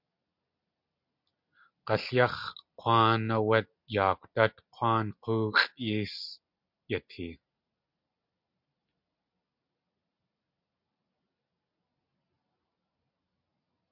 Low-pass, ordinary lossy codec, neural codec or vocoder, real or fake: 5.4 kHz; MP3, 48 kbps; none; real